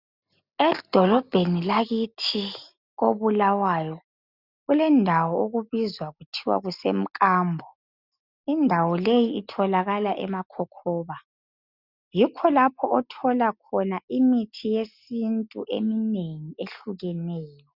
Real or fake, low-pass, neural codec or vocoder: real; 5.4 kHz; none